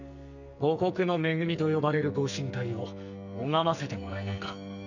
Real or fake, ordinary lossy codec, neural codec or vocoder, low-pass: fake; none; codec, 44.1 kHz, 2.6 kbps, SNAC; 7.2 kHz